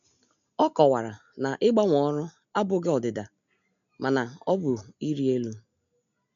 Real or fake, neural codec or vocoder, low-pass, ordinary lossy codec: real; none; 7.2 kHz; none